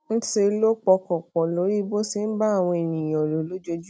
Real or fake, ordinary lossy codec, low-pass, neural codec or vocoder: real; none; none; none